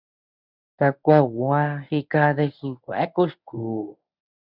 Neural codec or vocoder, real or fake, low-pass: codec, 24 kHz, 0.9 kbps, WavTokenizer, medium speech release version 1; fake; 5.4 kHz